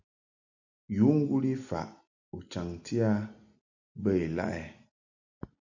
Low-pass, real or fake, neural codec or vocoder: 7.2 kHz; real; none